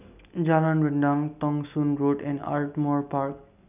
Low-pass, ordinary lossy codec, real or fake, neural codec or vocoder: 3.6 kHz; none; real; none